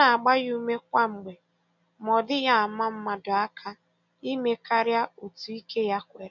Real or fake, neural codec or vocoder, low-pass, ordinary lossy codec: real; none; 7.2 kHz; none